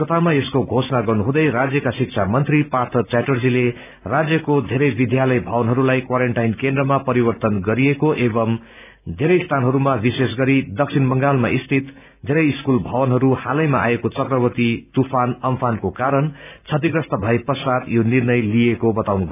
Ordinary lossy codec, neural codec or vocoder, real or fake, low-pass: none; none; real; 3.6 kHz